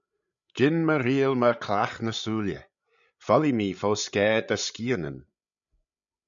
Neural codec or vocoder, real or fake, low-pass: codec, 16 kHz, 8 kbps, FreqCodec, larger model; fake; 7.2 kHz